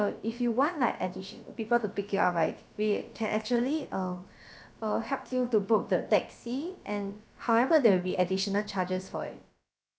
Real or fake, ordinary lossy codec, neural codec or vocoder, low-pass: fake; none; codec, 16 kHz, about 1 kbps, DyCAST, with the encoder's durations; none